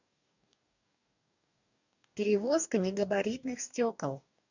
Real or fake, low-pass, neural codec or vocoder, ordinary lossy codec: fake; 7.2 kHz; codec, 44.1 kHz, 2.6 kbps, DAC; none